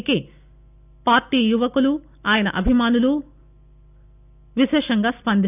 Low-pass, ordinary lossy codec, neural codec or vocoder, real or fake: 3.6 kHz; none; none; real